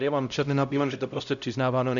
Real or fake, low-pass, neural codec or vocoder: fake; 7.2 kHz; codec, 16 kHz, 0.5 kbps, X-Codec, HuBERT features, trained on LibriSpeech